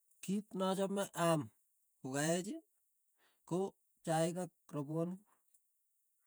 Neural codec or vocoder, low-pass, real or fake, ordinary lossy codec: vocoder, 48 kHz, 128 mel bands, Vocos; none; fake; none